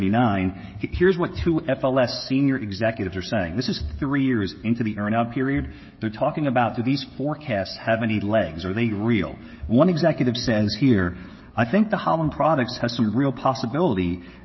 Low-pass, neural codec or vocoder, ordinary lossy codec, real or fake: 7.2 kHz; codec, 16 kHz, 16 kbps, FreqCodec, smaller model; MP3, 24 kbps; fake